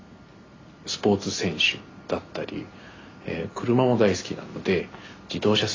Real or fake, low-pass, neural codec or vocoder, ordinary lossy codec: real; 7.2 kHz; none; AAC, 32 kbps